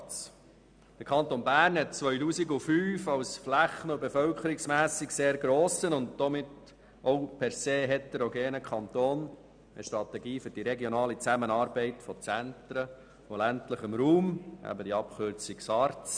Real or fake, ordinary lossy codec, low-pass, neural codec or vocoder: real; none; 9.9 kHz; none